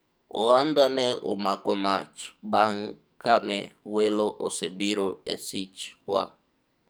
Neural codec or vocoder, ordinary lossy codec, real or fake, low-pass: codec, 44.1 kHz, 2.6 kbps, SNAC; none; fake; none